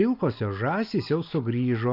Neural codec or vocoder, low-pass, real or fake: none; 5.4 kHz; real